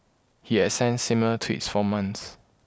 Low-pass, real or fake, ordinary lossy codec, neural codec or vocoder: none; real; none; none